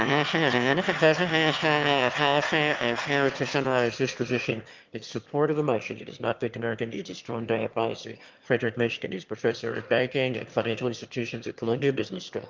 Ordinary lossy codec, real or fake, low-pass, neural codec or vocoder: Opus, 32 kbps; fake; 7.2 kHz; autoencoder, 22.05 kHz, a latent of 192 numbers a frame, VITS, trained on one speaker